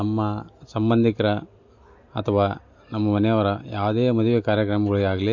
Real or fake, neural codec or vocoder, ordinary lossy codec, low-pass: real; none; MP3, 48 kbps; 7.2 kHz